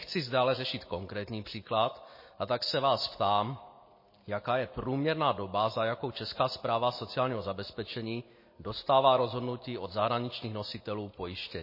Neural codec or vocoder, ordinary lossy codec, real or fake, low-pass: none; MP3, 24 kbps; real; 5.4 kHz